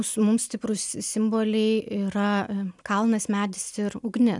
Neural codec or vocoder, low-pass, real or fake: none; 10.8 kHz; real